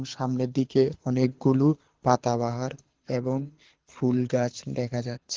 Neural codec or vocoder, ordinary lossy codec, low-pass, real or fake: codec, 24 kHz, 6 kbps, HILCodec; Opus, 16 kbps; 7.2 kHz; fake